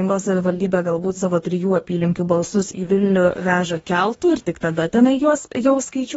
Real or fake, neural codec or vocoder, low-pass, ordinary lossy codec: fake; codec, 44.1 kHz, 2.6 kbps, DAC; 19.8 kHz; AAC, 24 kbps